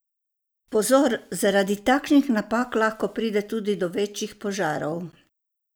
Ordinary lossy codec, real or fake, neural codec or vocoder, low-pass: none; real; none; none